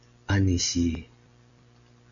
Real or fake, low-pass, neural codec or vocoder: real; 7.2 kHz; none